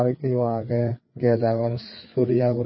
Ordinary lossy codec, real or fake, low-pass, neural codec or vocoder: MP3, 24 kbps; fake; 7.2 kHz; codec, 16 kHz, 4 kbps, FreqCodec, larger model